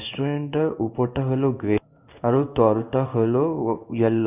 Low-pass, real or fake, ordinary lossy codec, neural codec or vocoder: 3.6 kHz; fake; none; codec, 16 kHz in and 24 kHz out, 1 kbps, XY-Tokenizer